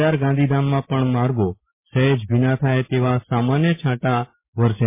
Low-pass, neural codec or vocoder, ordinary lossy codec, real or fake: 3.6 kHz; none; MP3, 24 kbps; real